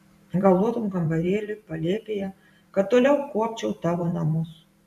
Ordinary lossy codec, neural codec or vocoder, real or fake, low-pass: AAC, 96 kbps; vocoder, 44.1 kHz, 128 mel bands every 512 samples, BigVGAN v2; fake; 14.4 kHz